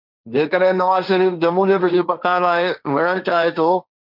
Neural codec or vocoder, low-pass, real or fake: codec, 16 kHz, 1.1 kbps, Voila-Tokenizer; 5.4 kHz; fake